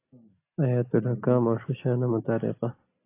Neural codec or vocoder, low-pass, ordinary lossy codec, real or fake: none; 3.6 kHz; AAC, 24 kbps; real